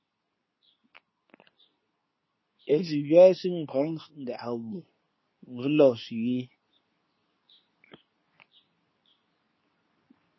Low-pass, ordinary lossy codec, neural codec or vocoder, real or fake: 7.2 kHz; MP3, 24 kbps; codec, 24 kHz, 0.9 kbps, WavTokenizer, medium speech release version 2; fake